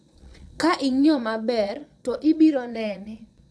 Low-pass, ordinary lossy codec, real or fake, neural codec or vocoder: none; none; fake; vocoder, 22.05 kHz, 80 mel bands, Vocos